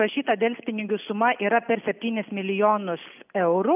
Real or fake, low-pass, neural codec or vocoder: real; 3.6 kHz; none